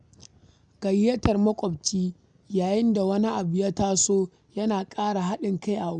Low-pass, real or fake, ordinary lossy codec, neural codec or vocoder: 9.9 kHz; real; none; none